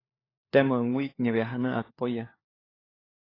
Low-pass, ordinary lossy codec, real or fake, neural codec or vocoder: 5.4 kHz; AAC, 24 kbps; fake; codec, 16 kHz, 4 kbps, FunCodec, trained on LibriTTS, 50 frames a second